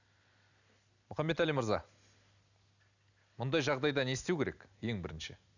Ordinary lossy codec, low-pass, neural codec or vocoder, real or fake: none; 7.2 kHz; none; real